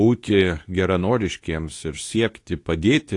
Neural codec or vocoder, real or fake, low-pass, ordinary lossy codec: codec, 24 kHz, 0.9 kbps, WavTokenizer, medium speech release version 2; fake; 10.8 kHz; AAC, 48 kbps